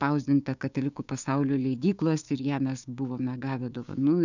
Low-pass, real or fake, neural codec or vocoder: 7.2 kHz; fake; codec, 16 kHz, 6 kbps, DAC